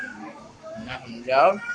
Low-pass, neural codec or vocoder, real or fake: 9.9 kHz; codec, 44.1 kHz, 7.8 kbps, Pupu-Codec; fake